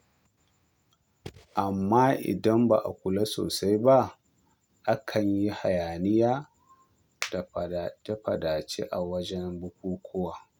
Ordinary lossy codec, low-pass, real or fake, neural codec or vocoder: none; 19.8 kHz; real; none